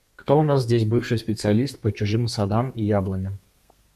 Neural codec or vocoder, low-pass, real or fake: codec, 32 kHz, 1.9 kbps, SNAC; 14.4 kHz; fake